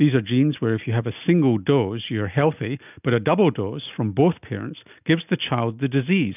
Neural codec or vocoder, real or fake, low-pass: none; real; 3.6 kHz